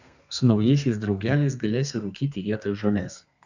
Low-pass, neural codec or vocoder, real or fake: 7.2 kHz; codec, 32 kHz, 1.9 kbps, SNAC; fake